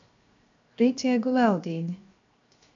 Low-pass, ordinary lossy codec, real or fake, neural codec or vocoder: 7.2 kHz; MP3, 64 kbps; fake; codec, 16 kHz, 0.7 kbps, FocalCodec